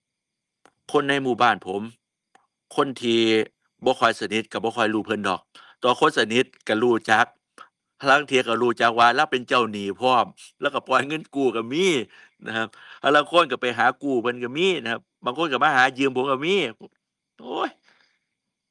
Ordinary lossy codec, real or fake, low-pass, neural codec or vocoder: Opus, 32 kbps; real; 10.8 kHz; none